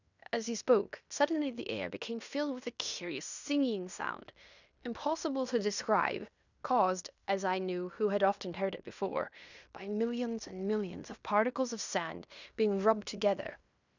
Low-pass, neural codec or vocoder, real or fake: 7.2 kHz; codec, 16 kHz in and 24 kHz out, 0.9 kbps, LongCat-Audio-Codec, fine tuned four codebook decoder; fake